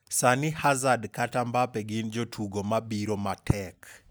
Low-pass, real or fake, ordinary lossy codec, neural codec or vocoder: none; real; none; none